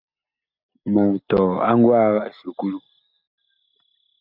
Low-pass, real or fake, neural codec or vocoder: 5.4 kHz; real; none